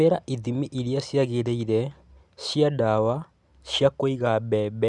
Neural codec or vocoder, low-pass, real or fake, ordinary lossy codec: none; 10.8 kHz; real; none